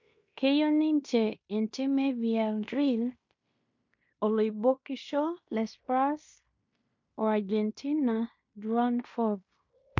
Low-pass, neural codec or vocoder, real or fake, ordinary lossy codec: 7.2 kHz; codec, 16 kHz in and 24 kHz out, 0.9 kbps, LongCat-Audio-Codec, fine tuned four codebook decoder; fake; MP3, 48 kbps